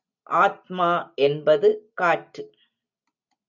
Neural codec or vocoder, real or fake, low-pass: none; real; 7.2 kHz